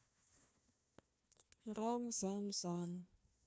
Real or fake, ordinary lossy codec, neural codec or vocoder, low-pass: fake; none; codec, 16 kHz, 1 kbps, FunCodec, trained on Chinese and English, 50 frames a second; none